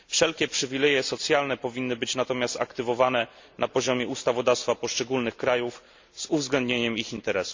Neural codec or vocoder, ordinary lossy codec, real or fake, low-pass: none; MP3, 64 kbps; real; 7.2 kHz